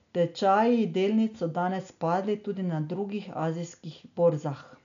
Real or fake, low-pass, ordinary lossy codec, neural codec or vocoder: real; 7.2 kHz; none; none